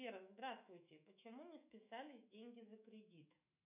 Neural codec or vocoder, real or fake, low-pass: autoencoder, 48 kHz, 128 numbers a frame, DAC-VAE, trained on Japanese speech; fake; 3.6 kHz